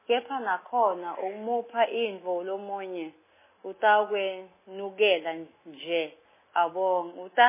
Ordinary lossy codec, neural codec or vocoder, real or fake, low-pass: MP3, 16 kbps; none; real; 3.6 kHz